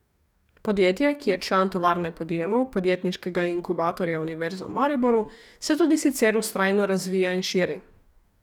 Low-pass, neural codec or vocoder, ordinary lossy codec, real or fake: 19.8 kHz; codec, 44.1 kHz, 2.6 kbps, DAC; none; fake